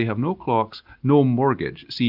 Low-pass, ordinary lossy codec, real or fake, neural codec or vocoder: 5.4 kHz; Opus, 24 kbps; real; none